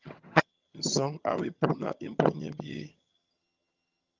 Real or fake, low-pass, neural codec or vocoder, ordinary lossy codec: fake; 7.2 kHz; vocoder, 22.05 kHz, 80 mel bands, HiFi-GAN; Opus, 24 kbps